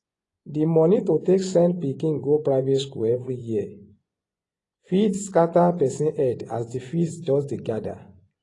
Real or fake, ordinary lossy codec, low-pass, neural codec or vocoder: fake; AAC, 32 kbps; 10.8 kHz; vocoder, 24 kHz, 100 mel bands, Vocos